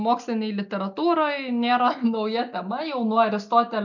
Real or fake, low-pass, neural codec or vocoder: real; 7.2 kHz; none